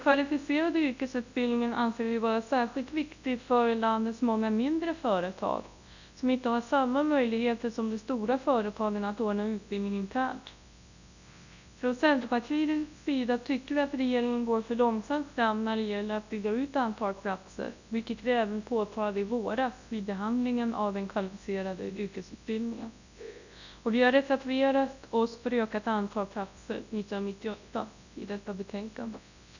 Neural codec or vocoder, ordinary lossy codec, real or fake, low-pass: codec, 24 kHz, 0.9 kbps, WavTokenizer, large speech release; none; fake; 7.2 kHz